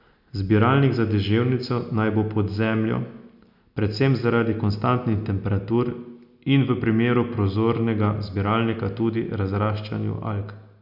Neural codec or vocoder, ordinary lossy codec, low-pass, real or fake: none; none; 5.4 kHz; real